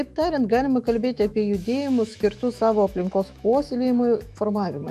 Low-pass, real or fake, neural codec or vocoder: 14.4 kHz; fake; autoencoder, 48 kHz, 128 numbers a frame, DAC-VAE, trained on Japanese speech